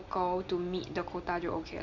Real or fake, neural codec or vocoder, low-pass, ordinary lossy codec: real; none; 7.2 kHz; none